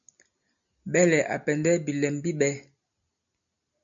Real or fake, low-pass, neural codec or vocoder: real; 7.2 kHz; none